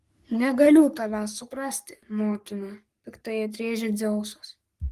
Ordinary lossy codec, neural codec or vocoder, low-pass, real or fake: Opus, 24 kbps; codec, 44.1 kHz, 3.4 kbps, Pupu-Codec; 14.4 kHz; fake